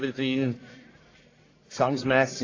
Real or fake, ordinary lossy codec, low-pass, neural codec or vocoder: fake; AAC, 32 kbps; 7.2 kHz; codec, 44.1 kHz, 1.7 kbps, Pupu-Codec